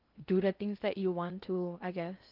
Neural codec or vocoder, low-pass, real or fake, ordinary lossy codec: codec, 16 kHz in and 24 kHz out, 0.8 kbps, FocalCodec, streaming, 65536 codes; 5.4 kHz; fake; Opus, 32 kbps